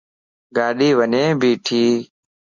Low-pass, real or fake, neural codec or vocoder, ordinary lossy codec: 7.2 kHz; real; none; Opus, 64 kbps